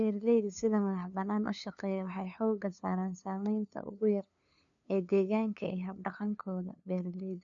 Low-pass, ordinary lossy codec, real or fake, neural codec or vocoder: 7.2 kHz; MP3, 64 kbps; fake; codec, 16 kHz, 2 kbps, FunCodec, trained on LibriTTS, 25 frames a second